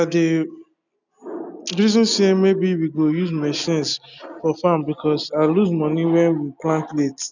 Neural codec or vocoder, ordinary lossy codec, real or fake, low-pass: none; none; real; 7.2 kHz